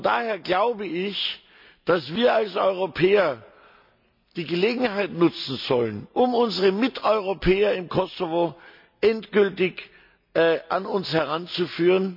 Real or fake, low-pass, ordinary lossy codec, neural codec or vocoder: real; 5.4 kHz; MP3, 48 kbps; none